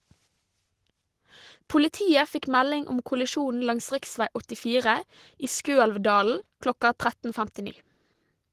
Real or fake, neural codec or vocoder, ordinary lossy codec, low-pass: fake; codec, 44.1 kHz, 7.8 kbps, Pupu-Codec; Opus, 16 kbps; 14.4 kHz